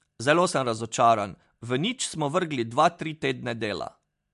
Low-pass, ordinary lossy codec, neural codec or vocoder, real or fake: 10.8 kHz; MP3, 64 kbps; none; real